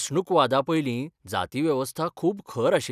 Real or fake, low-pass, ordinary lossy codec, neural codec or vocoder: real; 14.4 kHz; none; none